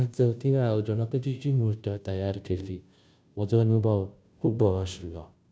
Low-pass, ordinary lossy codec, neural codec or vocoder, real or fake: none; none; codec, 16 kHz, 0.5 kbps, FunCodec, trained on Chinese and English, 25 frames a second; fake